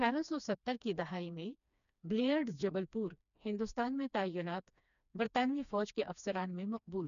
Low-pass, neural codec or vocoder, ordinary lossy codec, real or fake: 7.2 kHz; codec, 16 kHz, 2 kbps, FreqCodec, smaller model; none; fake